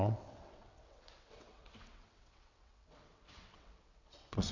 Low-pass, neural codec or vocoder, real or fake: 7.2 kHz; vocoder, 22.05 kHz, 80 mel bands, WaveNeXt; fake